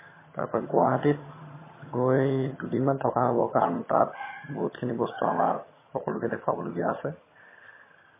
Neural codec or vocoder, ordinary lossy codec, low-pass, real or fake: vocoder, 22.05 kHz, 80 mel bands, HiFi-GAN; MP3, 16 kbps; 3.6 kHz; fake